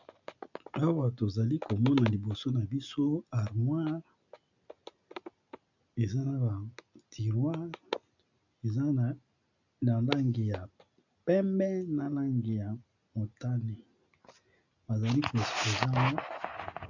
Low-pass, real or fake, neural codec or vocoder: 7.2 kHz; real; none